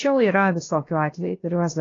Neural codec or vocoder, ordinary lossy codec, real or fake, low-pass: codec, 16 kHz, 0.7 kbps, FocalCodec; AAC, 32 kbps; fake; 7.2 kHz